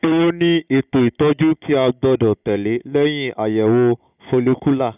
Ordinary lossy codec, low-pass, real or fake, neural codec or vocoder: none; 3.6 kHz; real; none